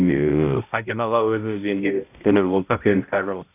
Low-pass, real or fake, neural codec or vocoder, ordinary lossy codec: 3.6 kHz; fake; codec, 16 kHz, 0.5 kbps, X-Codec, HuBERT features, trained on balanced general audio; none